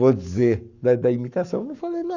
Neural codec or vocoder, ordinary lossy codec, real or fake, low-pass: codec, 44.1 kHz, 7.8 kbps, Pupu-Codec; none; fake; 7.2 kHz